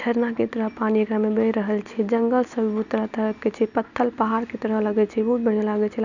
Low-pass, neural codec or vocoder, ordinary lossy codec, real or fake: 7.2 kHz; none; none; real